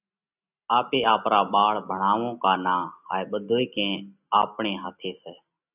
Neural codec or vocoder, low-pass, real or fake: none; 3.6 kHz; real